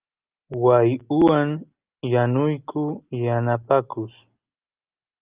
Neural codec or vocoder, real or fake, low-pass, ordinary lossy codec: none; real; 3.6 kHz; Opus, 32 kbps